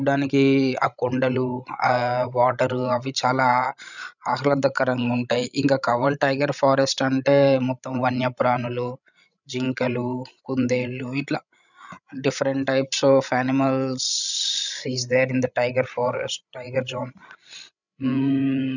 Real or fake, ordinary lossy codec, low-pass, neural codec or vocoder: fake; none; 7.2 kHz; codec, 16 kHz, 16 kbps, FreqCodec, larger model